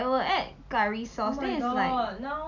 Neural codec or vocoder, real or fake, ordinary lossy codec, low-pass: none; real; none; 7.2 kHz